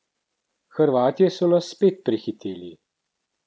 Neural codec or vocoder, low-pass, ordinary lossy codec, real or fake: none; none; none; real